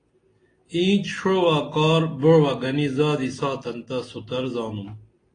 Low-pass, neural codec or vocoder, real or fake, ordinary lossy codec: 9.9 kHz; none; real; AAC, 32 kbps